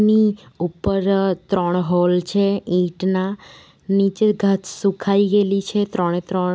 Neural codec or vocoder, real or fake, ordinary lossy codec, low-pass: none; real; none; none